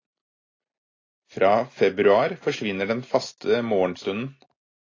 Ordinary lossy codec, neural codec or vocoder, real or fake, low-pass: AAC, 32 kbps; none; real; 7.2 kHz